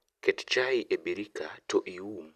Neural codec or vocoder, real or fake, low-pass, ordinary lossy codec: none; real; 14.4 kHz; Opus, 64 kbps